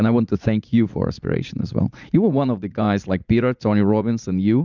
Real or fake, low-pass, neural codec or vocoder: fake; 7.2 kHz; vocoder, 44.1 kHz, 80 mel bands, Vocos